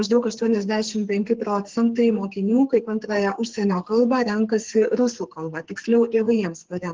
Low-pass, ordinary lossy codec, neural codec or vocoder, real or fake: 7.2 kHz; Opus, 16 kbps; codec, 44.1 kHz, 2.6 kbps, SNAC; fake